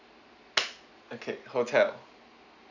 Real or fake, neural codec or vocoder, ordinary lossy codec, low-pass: real; none; none; 7.2 kHz